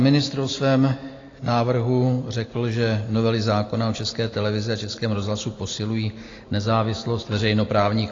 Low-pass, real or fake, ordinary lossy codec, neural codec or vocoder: 7.2 kHz; real; AAC, 32 kbps; none